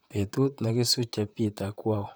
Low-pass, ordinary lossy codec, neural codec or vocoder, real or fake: none; none; vocoder, 44.1 kHz, 128 mel bands, Pupu-Vocoder; fake